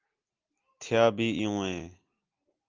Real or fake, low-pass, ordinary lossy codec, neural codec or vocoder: real; 7.2 kHz; Opus, 24 kbps; none